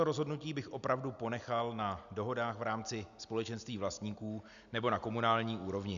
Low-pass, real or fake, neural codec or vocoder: 7.2 kHz; real; none